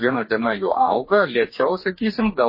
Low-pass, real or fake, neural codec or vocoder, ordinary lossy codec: 5.4 kHz; fake; codec, 44.1 kHz, 2.6 kbps, DAC; MP3, 24 kbps